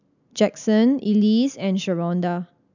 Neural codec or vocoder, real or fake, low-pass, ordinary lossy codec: none; real; 7.2 kHz; none